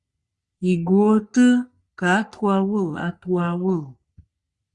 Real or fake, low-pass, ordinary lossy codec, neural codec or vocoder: fake; 10.8 kHz; Opus, 64 kbps; codec, 44.1 kHz, 3.4 kbps, Pupu-Codec